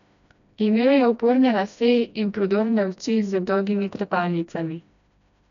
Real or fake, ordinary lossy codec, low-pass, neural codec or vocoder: fake; none; 7.2 kHz; codec, 16 kHz, 1 kbps, FreqCodec, smaller model